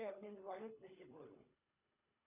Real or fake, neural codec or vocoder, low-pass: fake; codec, 24 kHz, 3 kbps, HILCodec; 3.6 kHz